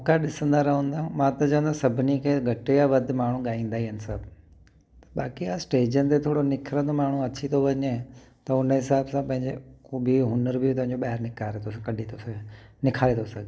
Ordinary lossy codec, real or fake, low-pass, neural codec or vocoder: none; real; none; none